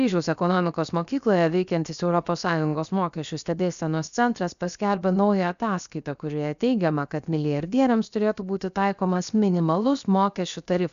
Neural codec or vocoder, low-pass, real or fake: codec, 16 kHz, 0.7 kbps, FocalCodec; 7.2 kHz; fake